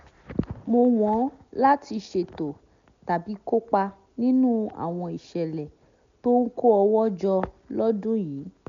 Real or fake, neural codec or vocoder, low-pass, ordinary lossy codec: real; none; 7.2 kHz; MP3, 64 kbps